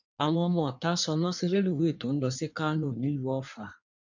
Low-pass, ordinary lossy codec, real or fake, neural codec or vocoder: 7.2 kHz; none; fake; codec, 16 kHz in and 24 kHz out, 1.1 kbps, FireRedTTS-2 codec